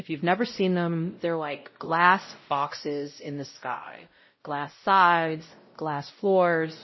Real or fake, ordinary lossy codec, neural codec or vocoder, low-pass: fake; MP3, 24 kbps; codec, 16 kHz, 0.5 kbps, X-Codec, WavLM features, trained on Multilingual LibriSpeech; 7.2 kHz